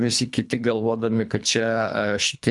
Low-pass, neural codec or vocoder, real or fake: 10.8 kHz; codec, 24 kHz, 3 kbps, HILCodec; fake